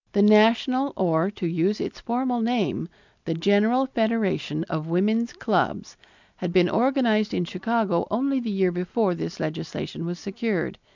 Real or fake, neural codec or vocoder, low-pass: real; none; 7.2 kHz